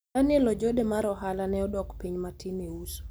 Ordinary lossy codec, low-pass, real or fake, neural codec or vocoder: none; none; real; none